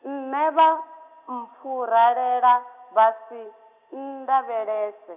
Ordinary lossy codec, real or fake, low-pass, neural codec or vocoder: none; real; 3.6 kHz; none